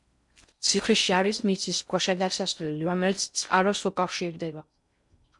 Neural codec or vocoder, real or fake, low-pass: codec, 16 kHz in and 24 kHz out, 0.6 kbps, FocalCodec, streaming, 4096 codes; fake; 10.8 kHz